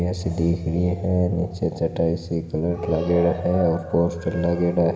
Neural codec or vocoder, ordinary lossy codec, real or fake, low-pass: none; none; real; none